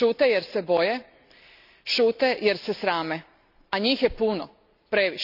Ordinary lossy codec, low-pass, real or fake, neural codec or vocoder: none; 5.4 kHz; real; none